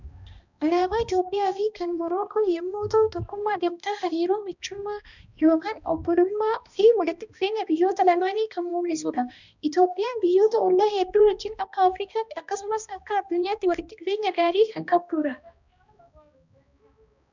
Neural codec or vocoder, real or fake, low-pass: codec, 16 kHz, 1 kbps, X-Codec, HuBERT features, trained on balanced general audio; fake; 7.2 kHz